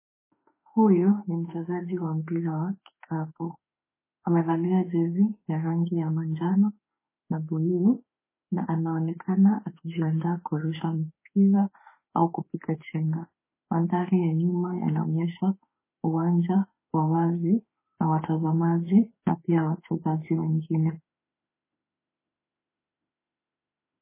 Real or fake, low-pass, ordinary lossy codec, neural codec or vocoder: fake; 3.6 kHz; MP3, 16 kbps; autoencoder, 48 kHz, 32 numbers a frame, DAC-VAE, trained on Japanese speech